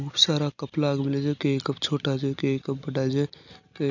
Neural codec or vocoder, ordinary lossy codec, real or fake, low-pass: none; none; real; 7.2 kHz